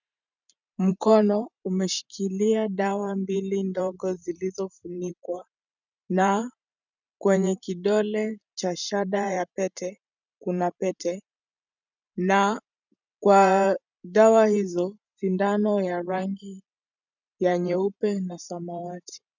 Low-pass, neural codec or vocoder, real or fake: 7.2 kHz; vocoder, 44.1 kHz, 128 mel bands every 512 samples, BigVGAN v2; fake